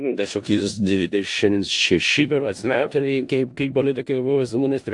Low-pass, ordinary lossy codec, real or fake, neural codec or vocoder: 10.8 kHz; AAC, 64 kbps; fake; codec, 16 kHz in and 24 kHz out, 0.4 kbps, LongCat-Audio-Codec, four codebook decoder